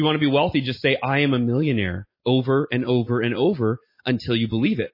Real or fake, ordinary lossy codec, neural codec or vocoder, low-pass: real; MP3, 24 kbps; none; 5.4 kHz